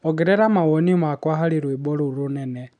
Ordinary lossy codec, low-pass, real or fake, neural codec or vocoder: none; 9.9 kHz; real; none